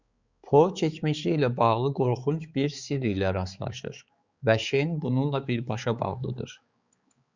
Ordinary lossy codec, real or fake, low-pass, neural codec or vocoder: Opus, 64 kbps; fake; 7.2 kHz; codec, 16 kHz, 4 kbps, X-Codec, HuBERT features, trained on balanced general audio